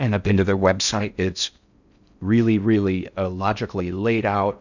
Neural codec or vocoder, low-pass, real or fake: codec, 16 kHz in and 24 kHz out, 0.6 kbps, FocalCodec, streaming, 4096 codes; 7.2 kHz; fake